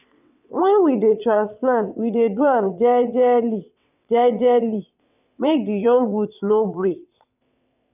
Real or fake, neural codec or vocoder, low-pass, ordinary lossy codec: fake; vocoder, 22.05 kHz, 80 mel bands, WaveNeXt; 3.6 kHz; none